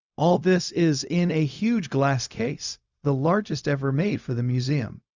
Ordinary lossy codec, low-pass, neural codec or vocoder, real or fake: Opus, 64 kbps; 7.2 kHz; codec, 16 kHz, 0.4 kbps, LongCat-Audio-Codec; fake